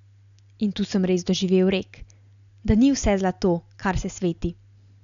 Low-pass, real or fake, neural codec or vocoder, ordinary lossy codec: 7.2 kHz; real; none; none